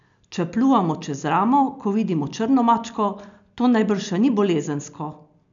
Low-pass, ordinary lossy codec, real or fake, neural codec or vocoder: 7.2 kHz; none; real; none